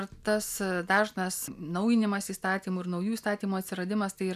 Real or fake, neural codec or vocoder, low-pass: real; none; 14.4 kHz